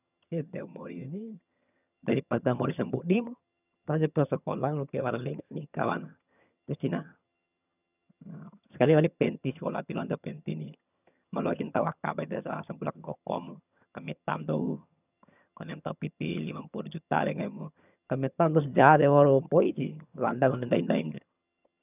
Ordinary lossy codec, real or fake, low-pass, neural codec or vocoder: none; fake; 3.6 kHz; vocoder, 22.05 kHz, 80 mel bands, HiFi-GAN